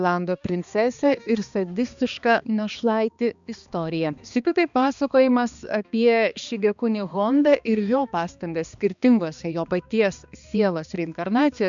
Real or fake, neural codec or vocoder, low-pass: fake; codec, 16 kHz, 2 kbps, X-Codec, HuBERT features, trained on balanced general audio; 7.2 kHz